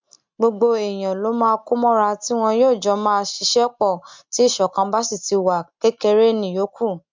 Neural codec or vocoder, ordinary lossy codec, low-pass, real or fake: none; MP3, 64 kbps; 7.2 kHz; real